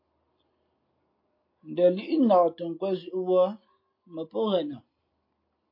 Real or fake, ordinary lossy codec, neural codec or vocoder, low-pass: real; MP3, 32 kbps; none; 5.4 kHz